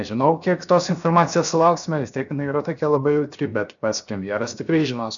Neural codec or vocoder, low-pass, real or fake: codec, 16 kHz, 0.7 kbps, FocalCodec; 7.2 kHz; fake